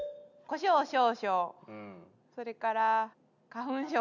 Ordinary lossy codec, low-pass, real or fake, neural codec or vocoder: none; 7.2 kHz; real; none